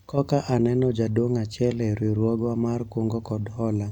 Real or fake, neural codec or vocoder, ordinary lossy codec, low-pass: real; none; none; 19.8 kHz